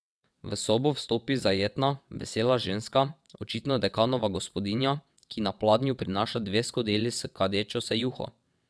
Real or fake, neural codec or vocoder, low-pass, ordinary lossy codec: fake; vocoder, 22.05 kHz, 80 mel bands, WaveNeXt; none; none